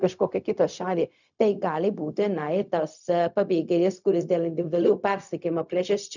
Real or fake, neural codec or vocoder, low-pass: fake; codec, 16 kHz, 0.4 kbps, LongCat-Audio-Codec; 7.2 kHz